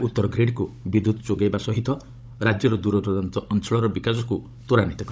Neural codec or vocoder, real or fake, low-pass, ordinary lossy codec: codec, 16 kHz, 16 kbps, FunCodec, trained on Chinese and English, 50 frames a second; fake; none; none